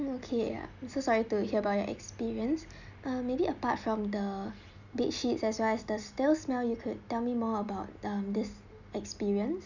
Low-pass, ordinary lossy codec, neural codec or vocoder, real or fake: 7.2 kHz; none; none; real